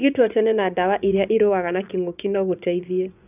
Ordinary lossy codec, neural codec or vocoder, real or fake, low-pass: none; vocoder, 24 kHz, 100 mel bands, Vocos; fake; 3.6 kHz